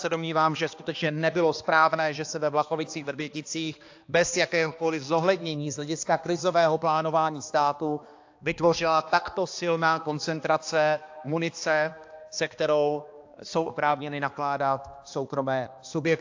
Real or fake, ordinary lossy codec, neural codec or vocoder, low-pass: fake; AAC, 48 kbps; codec, 16 kHz, 2 kbps, X-Codec, HuBERT features, trained on balanced general audio; 7.2 kHz